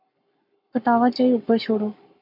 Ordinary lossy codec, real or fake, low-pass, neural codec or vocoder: MP3, 48 kbps; fake; 5.4 kHz; codec, 44.1 kHz, 7.8 kbps, Pupu-Codec